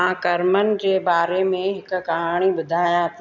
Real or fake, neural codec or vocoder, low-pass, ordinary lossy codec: real; none; 7.2 kHz; none